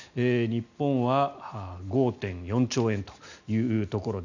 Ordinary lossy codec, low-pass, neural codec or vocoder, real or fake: none; 7.2 kHz; none; real